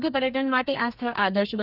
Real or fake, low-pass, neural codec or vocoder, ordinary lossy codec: fake; 5.4 kHz; codec, 32 kHz, 1.9 kbps, SNAC; none